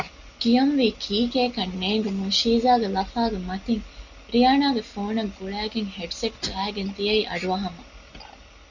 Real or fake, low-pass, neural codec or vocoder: real; 7.2 kHz; none